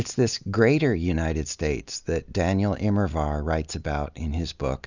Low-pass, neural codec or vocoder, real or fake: 7.2 kHz; none; real